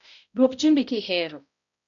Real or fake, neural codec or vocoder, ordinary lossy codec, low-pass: fake; codec, 16 kHz, 0.5 kbps, X-Codec, HuBERT features, trained on balanced general audio; none; 7.2 kHz